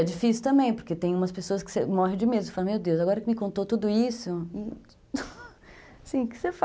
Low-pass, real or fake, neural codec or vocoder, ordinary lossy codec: none; real; none; none